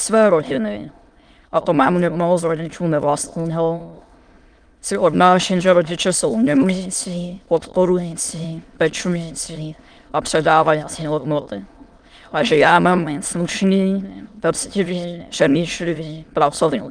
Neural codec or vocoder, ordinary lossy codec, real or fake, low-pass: autoencoder, 22.05 kHz, a latent of 192 numbers a frame, VITS, trained on many speakers; Opus, 32 kbps; fake; 9.9 kHz